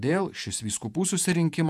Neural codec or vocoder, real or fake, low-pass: vocoder, 48 kHz, 128 mel bands, Vocos; fake; 14.4 kHz